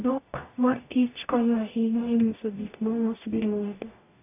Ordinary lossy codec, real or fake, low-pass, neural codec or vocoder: AAC, 24 kbps; fake; 3.6 kHz; codec, 44.1 kHz, 0.9 kbps, DAC